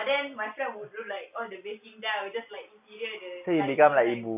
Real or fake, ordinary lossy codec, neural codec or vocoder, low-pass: real; none; none; 3.6 kHz